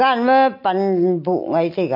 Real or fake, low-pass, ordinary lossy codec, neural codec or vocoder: real; 5.4 kHz; MP3, 32 kbps; none